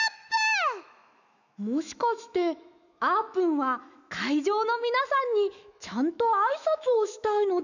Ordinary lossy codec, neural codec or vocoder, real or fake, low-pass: none; autoencoder, 48 kHz, 128 numbers a frame, DAC-VAE, trained on Japanese speech; fake; 7.2 kHz